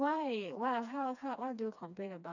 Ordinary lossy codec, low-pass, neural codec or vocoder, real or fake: none; 7.2 kHz; codec, 16 kHz, 2 kbps, FreqCodec, smaller model; fake